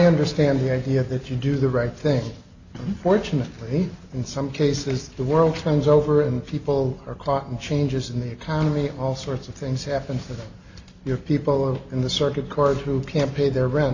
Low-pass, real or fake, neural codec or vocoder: 7.2 kHz; real; none